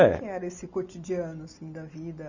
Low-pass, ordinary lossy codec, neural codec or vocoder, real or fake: 7.2 kHz; none; none; real